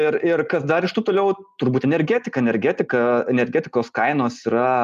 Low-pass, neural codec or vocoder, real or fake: 14.4 kHz; none; real